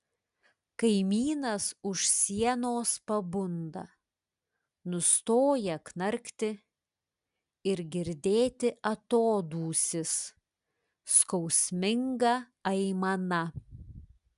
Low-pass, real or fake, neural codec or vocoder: 10.8 kHz; real; none